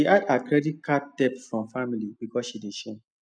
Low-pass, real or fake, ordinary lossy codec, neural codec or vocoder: 9.9 kHz; real; none; none